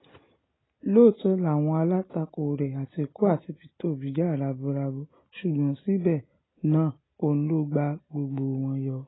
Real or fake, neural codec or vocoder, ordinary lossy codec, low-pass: real; none; AAC, 16 kbps; 7.2 kHz